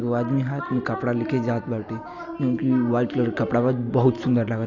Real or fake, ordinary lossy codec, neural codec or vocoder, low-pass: real; none; none; 7.2 kHz